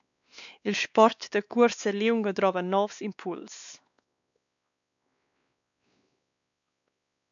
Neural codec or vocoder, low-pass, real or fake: codec, 16 kHz, 2 kbps, X-Codec, WavLM features, trained on Multilingual LibriSpeech; 7.2 kHz; fake